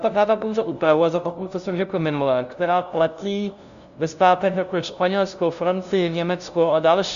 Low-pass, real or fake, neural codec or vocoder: 7.2 kHz; fake; codec, 16 kHz, 0.5 kbps, FunCodec, trained on LibriTTS, 25 frames a second